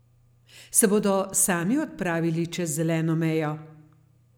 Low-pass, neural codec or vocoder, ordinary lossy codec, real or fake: none; none; none; real